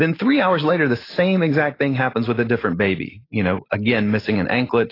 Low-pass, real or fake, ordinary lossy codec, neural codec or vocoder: 5.4 kHz; real; AAC, 24 kbps; none